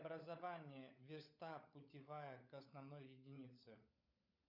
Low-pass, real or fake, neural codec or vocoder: 5.4 kHz; fake; codec, 16 kHz, 16 kbps, FunCodec, trained on LibriTTS, 50 frames a second